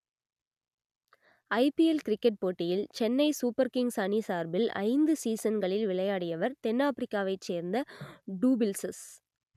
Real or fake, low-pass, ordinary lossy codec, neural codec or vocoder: real; 14.4 kHz; none; none